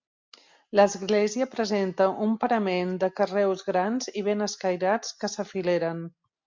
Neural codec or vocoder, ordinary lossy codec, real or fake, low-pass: none; MP3, 64 kbps; real; 7.2 kHz